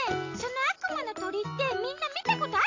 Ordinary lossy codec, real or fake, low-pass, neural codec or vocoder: AAC, 48 kbps; real; 7.2 kHz; none